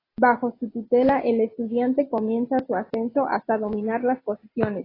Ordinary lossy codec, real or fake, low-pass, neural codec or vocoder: AAC, 24 kbps; real; 5.4 kHz; none